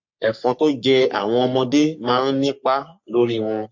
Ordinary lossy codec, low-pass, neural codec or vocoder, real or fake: MP3, 48 kbps; 7.2 kHz; codec, 44.1 kHz, 3.4 kbps, Pupu-Codec; fake